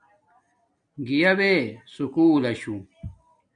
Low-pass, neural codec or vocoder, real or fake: 9.9 kHz; none; real